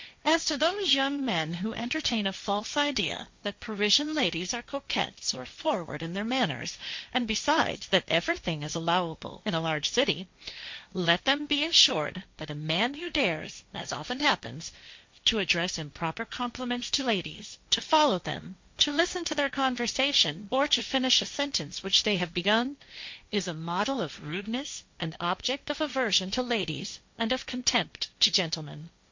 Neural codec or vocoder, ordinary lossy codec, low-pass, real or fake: codec, 16 kHz, 1.1 kbps, Voila-Tokenizer; MP3, 48 kbps; 7.2 kHz; fake